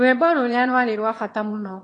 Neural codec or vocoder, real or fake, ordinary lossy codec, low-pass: autoencoder, 22.05 kHz, a latent of 192 numbers a frame, VITS, trained on one speaker; fake; AAC, 32 kbps; 9.9 kHz